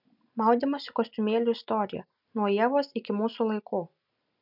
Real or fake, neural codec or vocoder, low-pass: real; none; 5.4 kHz